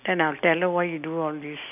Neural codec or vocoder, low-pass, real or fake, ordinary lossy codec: none; 3.6 kHz; real; none